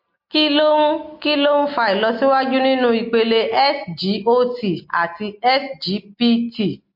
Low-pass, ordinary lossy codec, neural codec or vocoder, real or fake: 5.4 kHz; MP3, 32 kbps; none; real